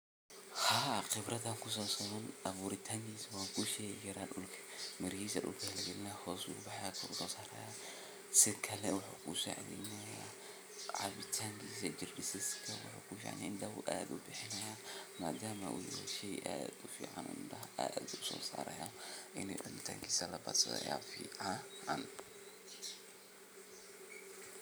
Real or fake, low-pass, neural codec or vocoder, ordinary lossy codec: real; none; none; none